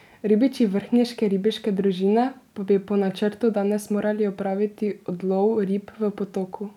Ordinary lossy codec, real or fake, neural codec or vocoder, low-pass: none; real; none; 19.8 kHz